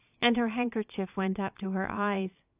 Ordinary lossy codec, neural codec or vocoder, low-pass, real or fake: AAC, 32 kbps; vocoder, 22.05 kHz, 80 mel bands, Vocos; 3.6 kHz; fake